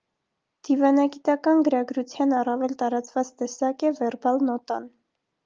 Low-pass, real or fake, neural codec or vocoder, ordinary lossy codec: 7.2 kHz; real; none; Opus, 24 kbps